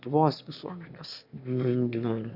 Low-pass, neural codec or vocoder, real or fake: 5.4 kHz; autoencoder, 22.05 kHz, a latent of 192 numbers a frame, VITS, trained on one speaker; fake